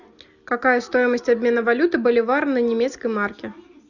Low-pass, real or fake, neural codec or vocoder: 7.2 kHz; real; none